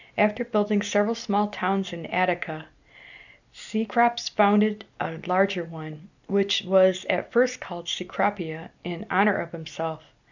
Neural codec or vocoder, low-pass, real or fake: none; 7.2 kHz; real